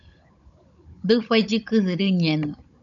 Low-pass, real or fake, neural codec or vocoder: 7.2 kHz; fake; codec, 16 kHz, 16 kbps, FunCodec, trained on Chinese and English, 50 frames a second